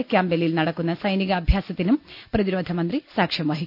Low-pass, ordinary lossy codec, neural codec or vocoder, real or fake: 5.4 kHz; none; none; real